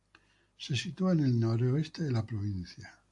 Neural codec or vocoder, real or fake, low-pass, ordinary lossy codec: none; real; 10.8 kHz; AAC, 64 kbps